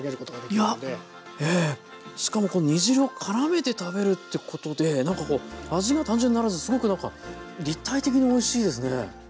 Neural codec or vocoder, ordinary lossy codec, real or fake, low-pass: none; none; real; none